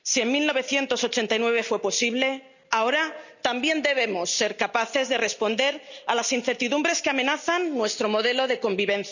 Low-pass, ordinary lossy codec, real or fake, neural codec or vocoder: 7.2 kHz; none; real; none